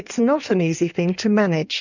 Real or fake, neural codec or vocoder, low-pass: fake; codec, 16 kHz in and 24 kHz out, 1.1 kbps, FireRedTTS-2 codec; 7.2 kHz